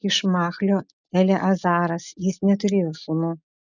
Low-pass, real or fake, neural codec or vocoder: 7.2 kHz; real; none